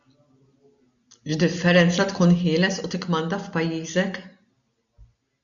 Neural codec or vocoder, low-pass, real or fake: none; 7.2 kHz; real